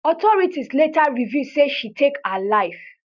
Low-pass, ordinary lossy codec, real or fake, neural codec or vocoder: 7.2 kHz; none; real; none